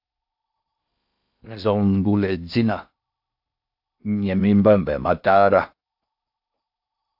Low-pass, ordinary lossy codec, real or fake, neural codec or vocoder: 5.4 kHz; AAC, 48 kbps; fake; codec, 16 kHz in and 24 kHz out, 0.6 kbps, FocalCodec, streaming, 4096 codes